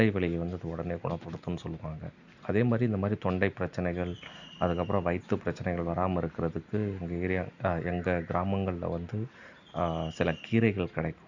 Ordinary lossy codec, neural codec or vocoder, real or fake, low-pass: none; none; real; 7.2 kHz